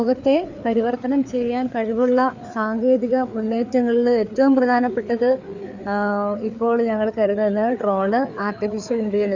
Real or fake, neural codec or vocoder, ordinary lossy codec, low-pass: fake; codec, 16 kHz, 4 kbps, FreqCodec, larger model; none; 7.2 kHz